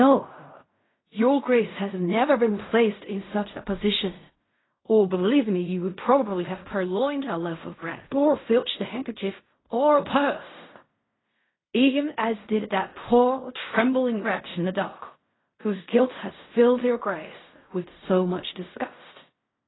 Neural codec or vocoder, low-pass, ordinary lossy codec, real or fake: codec, 16 kHz in and 24 kHz out, 0.4 kbps, LongCat-Audio-Codec, fine tuned four codebook decoder; 7.2 kHz; AAC, 16 kbps; fake